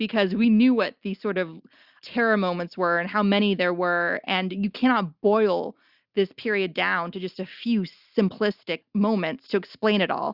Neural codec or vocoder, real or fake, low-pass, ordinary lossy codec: none; real; 5.4 kHz; Opus, 64 kbps